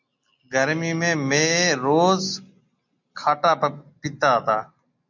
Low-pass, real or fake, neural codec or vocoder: 7.2 kHz; real; none